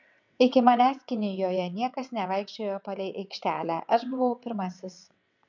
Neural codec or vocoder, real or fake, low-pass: vocoder, 22.05 kHz, 80 mel bands, WaveNeXt; fake; 7.2 kHz